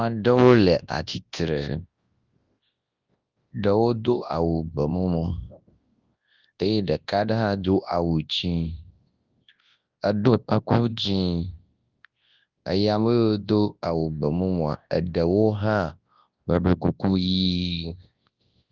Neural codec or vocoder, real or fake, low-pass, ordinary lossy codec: codec, 24 kHz, 0.9 kbps, WavTokenizer, large speech release; fake; 7.2 kHz; Opus, 32 kbps